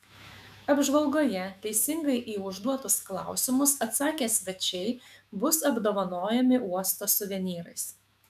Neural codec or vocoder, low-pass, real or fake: autoencoder, 48 kHz, 128 numbers a frame, DAC-VAE, trained on Japanese speech; 14.4 kHz; fake